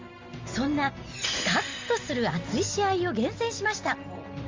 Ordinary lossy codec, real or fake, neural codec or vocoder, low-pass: Opus, 32 kbps; real; none; 7.2 kHz